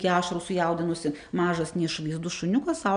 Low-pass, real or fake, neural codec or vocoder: 9.9 kHz; real; none